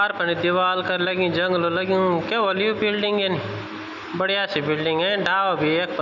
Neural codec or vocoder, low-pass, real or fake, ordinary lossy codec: none; 7.2 kHz; real; none